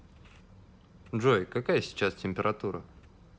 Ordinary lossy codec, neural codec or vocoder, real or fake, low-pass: none; none; real; none